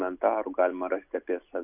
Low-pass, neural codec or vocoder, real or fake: 3.6 kHz; none; real